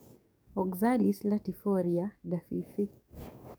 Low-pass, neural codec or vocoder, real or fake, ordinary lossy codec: none; codec, 44.1 kHz, 7.8 kbps, DAC; fake; none